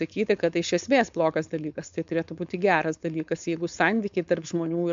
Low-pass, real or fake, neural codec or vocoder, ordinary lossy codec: 7.2 kHz; fake; codec, 16 kHz, 4.8 kbps, FACodec; MP3, 64 kbps